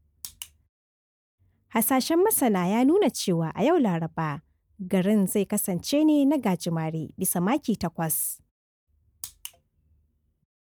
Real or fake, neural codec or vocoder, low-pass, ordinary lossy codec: real; none; none; none